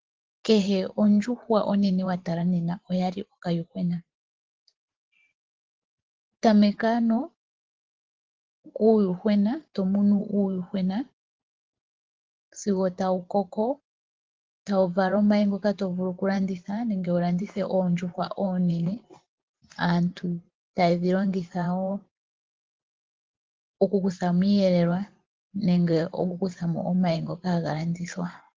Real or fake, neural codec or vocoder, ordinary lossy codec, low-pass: fake; vocoder, 44.1 kHz, 128 mel bands every 512 samples, BigVGAN v2; Opus, 16 kbps; 7.2 kHz